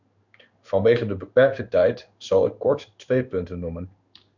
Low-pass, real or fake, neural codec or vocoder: 7.2 kHz; fake; codec, 16 kHz in and 24 kHz out, 1 kbps, XY-Tokenizer